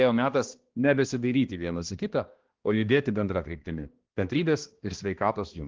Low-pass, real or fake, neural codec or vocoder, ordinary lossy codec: 7.2 kHz; fake; codec, 16 kHz, 1 kbps, X-Codec, HuBERT features, trained on balanced general audio; Opus, 16 kbps